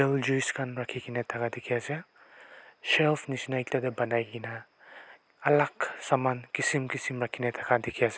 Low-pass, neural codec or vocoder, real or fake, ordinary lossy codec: none; none; real; none